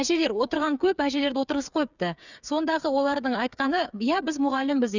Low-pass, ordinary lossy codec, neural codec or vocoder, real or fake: 7.2 kHz; none; codec, 16 kHz, 8 kbps, FreqCodec, smaller model; fake